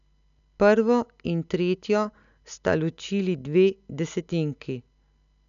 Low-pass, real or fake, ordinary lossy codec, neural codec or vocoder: 7.2 kHz; real; none; none